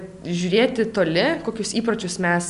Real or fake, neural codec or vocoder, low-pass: real; none; 10.8 kHz